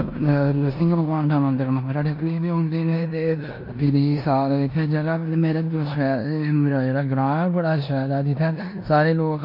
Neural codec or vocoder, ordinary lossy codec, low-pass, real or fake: codec, 16 kHz in and 24 kHz out, 0.9 kbps, LongCat-Audio-Codec, four codebook decoder; MP3, 32 kbps; 5.4 kHz; fake